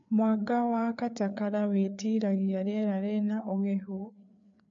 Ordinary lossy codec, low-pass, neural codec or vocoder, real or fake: MP3, 64 kbps; 7.2 kHz; codec, 16 kHz, 4 kbps, FreqCodec, larger model; fake